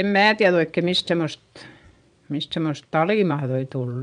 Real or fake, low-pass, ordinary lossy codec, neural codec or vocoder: fake; 9.9 kHz; none; vocoder, 22.05 kHz, 80 mel bands, Vocos